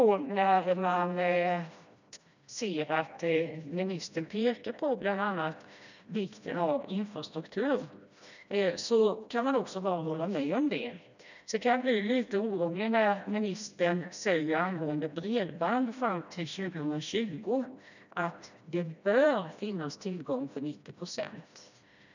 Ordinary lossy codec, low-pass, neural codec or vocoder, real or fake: none; 7.2 kHz; codec, 16 kHz, 1 kbps, FreqCodec, smaller model; fake